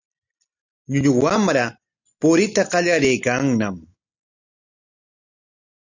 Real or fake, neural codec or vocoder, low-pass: real; none; 7.2 kHz